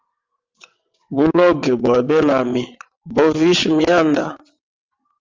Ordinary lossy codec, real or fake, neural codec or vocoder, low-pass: Opus, 32 kbps; fake; vocoder, 22.05 kHz, 80 mel bands, WaveNeXt; 7.2 kHz